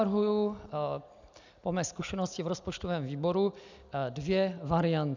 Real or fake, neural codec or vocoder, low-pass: real; none; 7.2 kHz